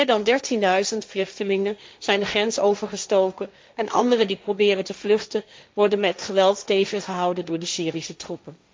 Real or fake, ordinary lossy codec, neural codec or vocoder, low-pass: fake; none; codec, 16 kHz, 1.1 kbps, Voila-Tokenizer; none